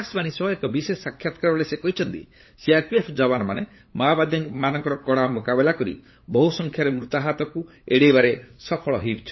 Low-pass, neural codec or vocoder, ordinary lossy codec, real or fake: 7.2 kHz; codec, 16 kHz, 8 kbps, FunCodec, trained on LibriTTS, 25 frames a second; MP3, 24 kbps; fake